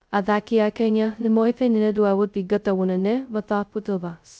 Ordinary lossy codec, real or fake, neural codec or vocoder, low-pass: none; fake; codec, 16 kHz, 0.2 kbps, FocalCodec; none